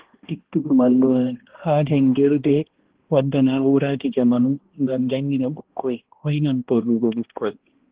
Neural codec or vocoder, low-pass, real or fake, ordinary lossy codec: codec, 16 kHz, 1 kbps, X-Codec, HuBERT features, trained on balanced general audio; 3.6 kHz; fake; Opus, 16 kbps